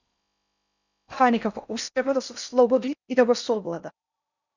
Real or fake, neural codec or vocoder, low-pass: fake; codec, 16 kHz in and 24 kHz out, 0.6 kbps, FocalCodec, streaming, 4096 codes; 7.2 kHz